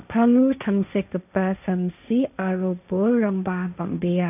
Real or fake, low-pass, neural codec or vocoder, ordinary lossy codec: fake; 3.6 kHz; codec, 16 kHz, 1.1 kbps, Voila-Tokenizer; none